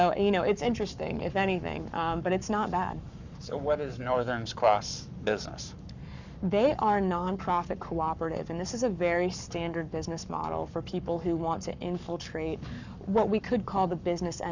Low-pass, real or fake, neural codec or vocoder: 7.2 kHz; fake; codec, 44.1 kHz, 7.8 kbps, Pupu-Codec